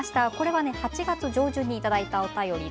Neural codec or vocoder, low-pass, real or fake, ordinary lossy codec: none; none; real; none